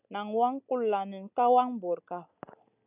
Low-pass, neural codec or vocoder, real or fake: 3.6 kHz; none; real